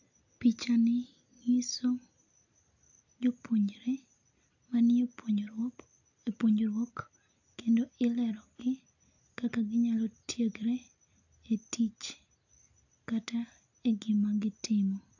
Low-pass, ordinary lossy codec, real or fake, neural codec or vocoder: 7.2 kHz; AAC, 48 kbps; real; none